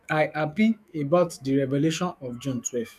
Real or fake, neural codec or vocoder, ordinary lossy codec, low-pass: fake; autoencoder, 48 kHz, 128 numbers a frame, DAC-VAE, trained on Japanese speech; none; 14.4 kHz